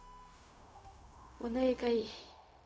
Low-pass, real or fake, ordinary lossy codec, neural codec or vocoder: none; fake; none; codec, 16 kHz, 0.4 kbps, LongCat-Audio-Codec